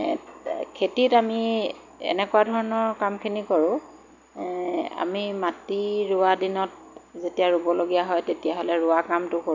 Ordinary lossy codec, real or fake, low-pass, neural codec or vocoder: none; real; 7.2 kHz; none